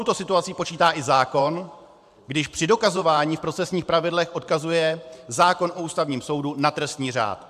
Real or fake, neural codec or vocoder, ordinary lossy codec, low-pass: fake; vocoder, 44.1 kHz, 128 mel bands every 512 samples, BigVGAN v2; AAC, 96 kbps; 14.4 kHz